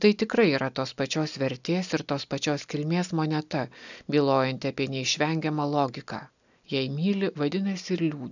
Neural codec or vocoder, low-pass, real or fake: none; 7.2 kHz; real